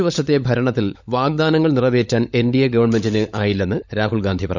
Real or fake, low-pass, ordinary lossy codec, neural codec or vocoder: fake; 7.2 kHz; none; codec, 16 kHz, 16 kbps, FunCodec, trained on LibriTTS, 50 frames a second